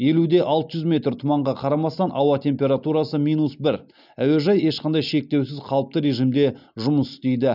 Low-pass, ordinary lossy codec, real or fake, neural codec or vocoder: 5.4 kHz; none; real; none